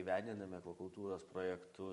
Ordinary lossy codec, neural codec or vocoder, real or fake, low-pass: MP3, 48 kbps; vocoder, 44.1 kHz, 128 mel bands every 512 samples, BigVGAN v2; fake; 14.4 kHz